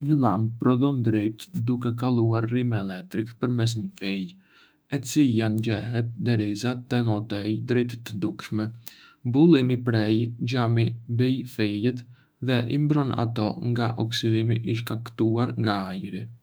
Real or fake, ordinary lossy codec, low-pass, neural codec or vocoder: fake; none; none; autoencoder, 48 kHz, 32 numbers a frame, DAC-VAE, trained on Japanese speech